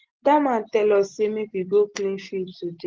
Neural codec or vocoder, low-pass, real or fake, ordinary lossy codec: none; 7.2 kHz; real; Opus, 16 kbps